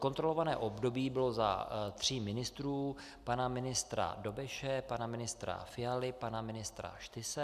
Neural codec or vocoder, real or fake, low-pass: none; real; 14.4 kHz